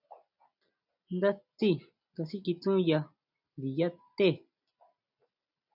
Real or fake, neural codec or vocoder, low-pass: real; none; 5.4 kHz